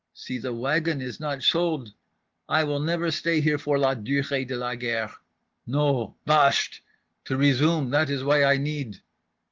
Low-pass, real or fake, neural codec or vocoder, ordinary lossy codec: 7.2 kHz; real; none; Opus, 16 kbps